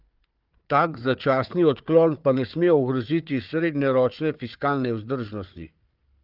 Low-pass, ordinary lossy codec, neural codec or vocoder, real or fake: 5.4 kHz; Opus, 24 kbps; codec, 16 kHz, 4 kbps, FunCodec, trained on Chinese and English, 50 frames a second; fake